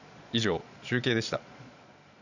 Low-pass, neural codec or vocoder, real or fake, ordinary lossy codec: 7.2 kHz; vocoder, 22.05 kHz, 80 mel bands, Vocos; fake; none